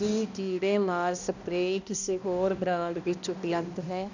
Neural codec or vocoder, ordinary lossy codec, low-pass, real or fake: codec, 16 kHz, 1 kbps, X-Codec, HuBERT features, trained on balanced general audio; none; 7.2 kHz; fake